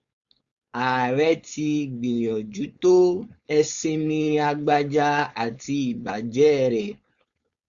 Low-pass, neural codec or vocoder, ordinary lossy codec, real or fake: 7.2 kHz; codec, 16 kHz, 4.8 kbps, FACodec; Opus, 64 kbps; fake